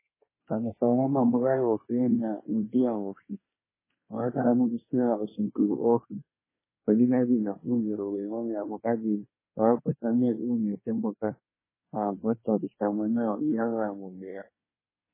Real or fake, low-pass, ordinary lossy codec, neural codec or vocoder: fake; 3.6 kHz; MP3, 16 kbps; codec, 24 kHz, 1 kbps, SNAC